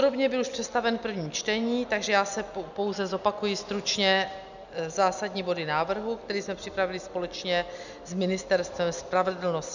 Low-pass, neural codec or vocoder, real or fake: 7.2 kHz; none; real